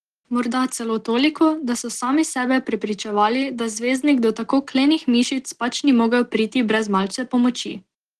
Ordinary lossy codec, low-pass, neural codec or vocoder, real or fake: Opus, 16 kbps; 9.9 kHz; none; real